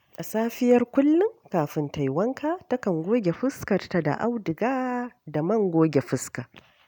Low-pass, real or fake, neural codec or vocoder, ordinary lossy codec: none; real; none; none